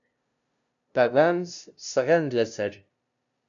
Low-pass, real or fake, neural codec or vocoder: 7.2 kHz; fake; codec, 16 kHz, 0.5 kbps, FunCodec, trained on LibriTTS, 25 frames a second